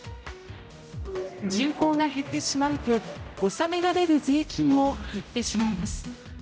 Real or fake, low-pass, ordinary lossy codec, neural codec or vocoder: fake; none; none; codec, 16 kHz, 0.5 kbps, X-Codec, HuBERT features, trained on general audio